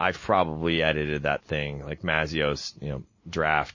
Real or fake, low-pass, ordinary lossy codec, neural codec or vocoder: real; 7.2 kHz; MP3, 32 kbps; none